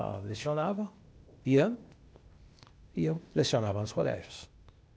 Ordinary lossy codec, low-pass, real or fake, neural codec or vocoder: none; none; fake; codec, 16 kHz, 0.8 kbps, ZipCodec